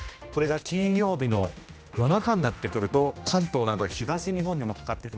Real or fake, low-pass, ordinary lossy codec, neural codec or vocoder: fake; none; none; codec, 16 kHz, 1 kbps, X-Codec, HuBERT features, trained on general audio